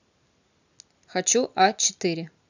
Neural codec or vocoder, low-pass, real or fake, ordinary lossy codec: none; 7.2 kHz; real; none